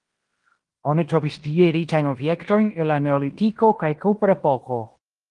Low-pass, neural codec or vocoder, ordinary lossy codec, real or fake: 10.8 kHz; codec, 16 kHz in and 24 kHz out, 0.9 kbps, LongCat-Audio-Codec, fine tuned four codebook decoder; Opus, 24 kbps; fake